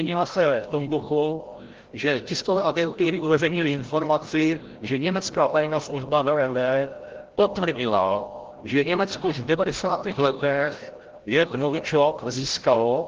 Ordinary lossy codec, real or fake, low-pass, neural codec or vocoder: Opus, 16 kbps; fake; 7.2 kHz; codec, 16 kHz, 0.5 kbps, FreqCodec, larger model